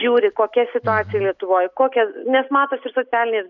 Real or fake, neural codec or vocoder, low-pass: real; none; 7.2 kHz